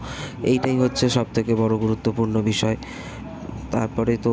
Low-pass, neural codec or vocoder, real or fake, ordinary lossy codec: none; none; real; none